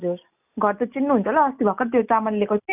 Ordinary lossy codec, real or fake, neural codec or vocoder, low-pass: none; real; none; 3.6 kHz